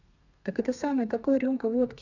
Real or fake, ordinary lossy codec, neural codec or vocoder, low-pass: fake; none; codec, 32 kHz, 1.9 kbps, SNAC; 7.2 kHz